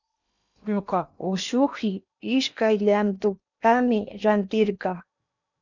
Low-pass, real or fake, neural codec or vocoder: 7.2 kHz; fake; codec, 16 kHz in and 24 kHz out, 0.8 kbps, FocalCodec, streaming, 65536 codes